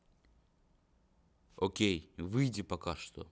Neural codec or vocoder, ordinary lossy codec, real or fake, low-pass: none; none; real; none